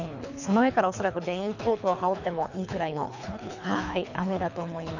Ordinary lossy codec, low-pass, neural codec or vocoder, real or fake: none; 7.2 kHz; codec, 24 kHz, 3 kbps, HILCodec; fake